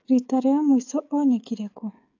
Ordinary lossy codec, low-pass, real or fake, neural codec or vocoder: AAC, 48 kbps; 7.2 kHz; fake; codec, 16 kHz, 16 kbps, FreqCodec, smaller model